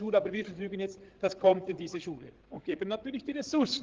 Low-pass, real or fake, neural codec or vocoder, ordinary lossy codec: 7.2 kHz; fake; codec, 16 kHz, 8 kbps, FreqCodec, larger model; Opus, 16 kbps